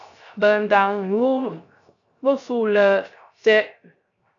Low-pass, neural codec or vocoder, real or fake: 7.2 kHz; codec, 16 kHz, 0.3 kbps, FocalCodec; fake